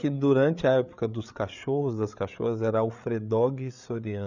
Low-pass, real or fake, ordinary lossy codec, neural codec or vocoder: 7.2 kHz; fake; none; codec, 16 kHz, 8 kbps, FreqCodec, larger model